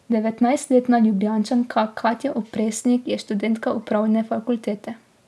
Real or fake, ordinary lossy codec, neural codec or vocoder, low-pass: real; none; none; none